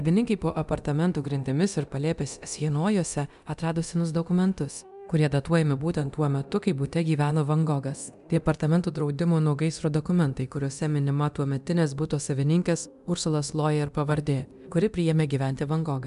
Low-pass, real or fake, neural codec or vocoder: 10.8 kHz; fake; codec, 24 kHz, 0.9 kbps, DualCodec